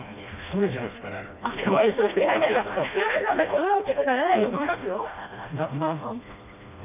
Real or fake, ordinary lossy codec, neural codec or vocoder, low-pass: fake; none; codec, 16 kHz, 1 kbps, FreqCodec, smaller model; 3.6 kHz